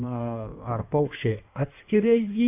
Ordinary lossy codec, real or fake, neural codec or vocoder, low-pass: AAC, 32 kbps; fake; codec, 16 kHz in and 24 kHz out, 1.1 kbps, FireRedTTS-2 codec; 3.6 kHz